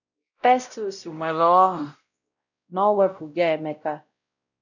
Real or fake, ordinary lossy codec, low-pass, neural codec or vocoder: fake; none; 7.2 kHz; codec, 16 kHz, 0.5 kbps, X-Codec, WavLM features, trained on Multilingual LibriSpeech